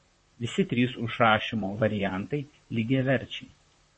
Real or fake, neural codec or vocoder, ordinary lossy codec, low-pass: fake; vocoder, 44.1 kHz, 128 mel bands, Pupu-Vocoder; MP3, 32 kbps; 9.9 kHz